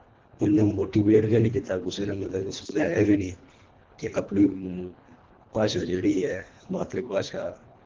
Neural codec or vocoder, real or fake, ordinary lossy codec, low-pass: codec, 24 kHz, 1.5 kbps, HILCodec; fake; Opus, 16 kbps; 7.2 kHz